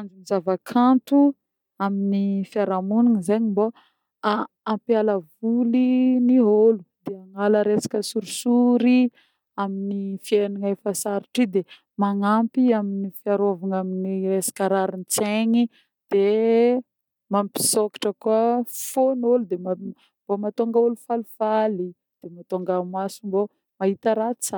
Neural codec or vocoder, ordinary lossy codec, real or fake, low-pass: none; none; real; 19.8 kHz